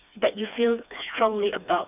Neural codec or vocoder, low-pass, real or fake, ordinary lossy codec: codec, 16 kHz, 2 kbps, FreqCodec, smaller model; 3.6 kHz; fake; none